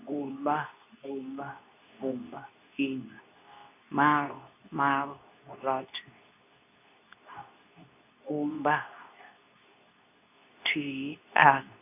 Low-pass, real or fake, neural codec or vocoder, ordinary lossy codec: 3.6 kHz; fake; codec, 24 kHz, 0.9 kbps, WavTokenizer, medium speech release version 2; none